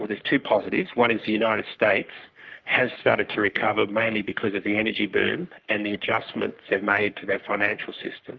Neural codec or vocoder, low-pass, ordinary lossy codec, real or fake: codec, 44.1 kHz, 3.4 kbps, Pupu-Codec; 7.2 kHz; Opus, 24 kbps; fake